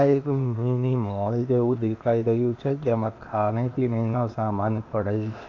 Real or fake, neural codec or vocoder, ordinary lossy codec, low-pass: fake; codec, 16 kHz, 0.8 kbps, ZipCodec; AAC, 48 kbps; 7.2 kHz